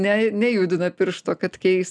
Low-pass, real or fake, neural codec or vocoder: 9.9 kHz; real; none